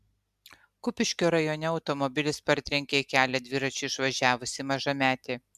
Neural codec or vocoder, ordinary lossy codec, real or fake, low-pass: none; MP3, 96 kbps; real; 14.4 kHz